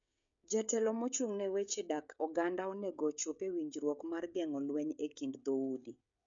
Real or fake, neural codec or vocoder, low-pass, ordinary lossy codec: fake; codec, 16 kHz, 16 kbps, FreqCodec, smaller model; 7.2 kHz; MP3, 64 kbps